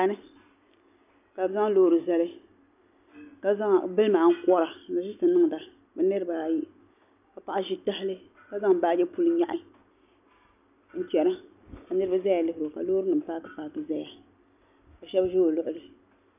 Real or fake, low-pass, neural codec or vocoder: real; 3.6 kHz; none